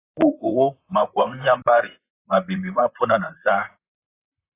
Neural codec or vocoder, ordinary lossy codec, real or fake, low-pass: vocoder, 44.1 kHz, 128 mel bands, Pupu-Vocoder; AAC, 24 kbps; fake; 3.6 kHz